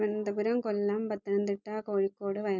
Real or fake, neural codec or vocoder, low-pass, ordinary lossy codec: real; none; 7.2 kHz; none